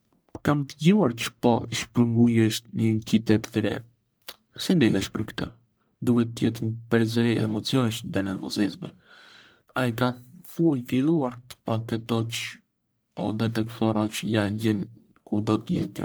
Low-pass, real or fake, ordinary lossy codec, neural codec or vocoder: none; fake; none; codec, 44.1 kHz, 1.7 kbps, Pupu-Codec